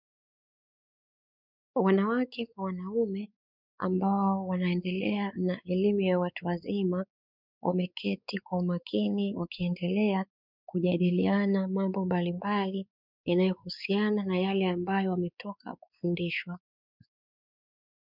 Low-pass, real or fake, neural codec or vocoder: 5.4 kHz; fake; codec, 16 kHz, 4 kbps, X-Codec, WavLM features, trained on Multilingual LibriSpeech